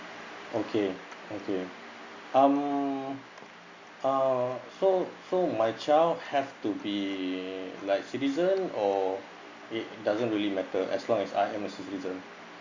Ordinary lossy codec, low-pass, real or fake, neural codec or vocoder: Opus, 64 kbps; 7.2 kHz; real; none